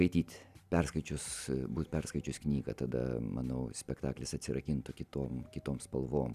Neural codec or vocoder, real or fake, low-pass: none; real; 14.4 kHz